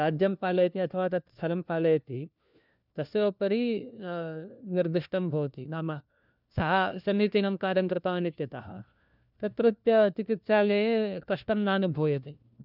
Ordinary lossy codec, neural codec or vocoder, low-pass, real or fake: none; codec, 16 kHz, 1 kbps, FunCodec, trained on LibriTTS, 50 frames a second; 5.4 kHz; fake